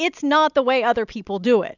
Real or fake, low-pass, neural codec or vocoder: real; 7.2 kHz; none